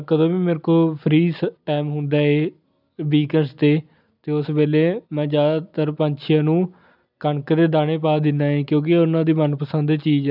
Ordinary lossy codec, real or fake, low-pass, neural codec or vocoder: none; real; 5.4 kHz; none